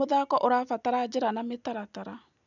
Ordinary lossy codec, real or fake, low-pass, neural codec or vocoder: none; real; 7.2 kHz; none